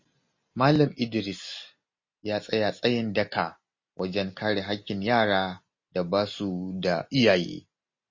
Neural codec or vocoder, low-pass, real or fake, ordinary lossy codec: none; 7.2 kHz; real; MP3, 32 kbps